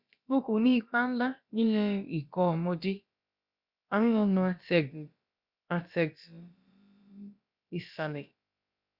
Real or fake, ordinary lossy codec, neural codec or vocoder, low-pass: fake; Opus, 64 kbps; codec, 16 kHz, about 1 kbps, DyCAST, with the encoder's durations; 5.4 kHz